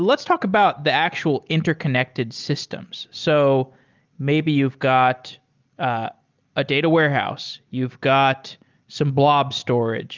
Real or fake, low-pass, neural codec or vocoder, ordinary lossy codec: real; 7.2 kHz; none; Opus, 32 kbps